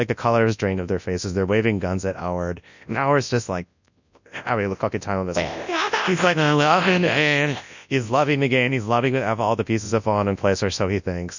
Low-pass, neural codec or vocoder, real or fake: 7.2 kHz; codec, 24 kHz, 0.9 kbps, WavTokenizer, large speech release; fake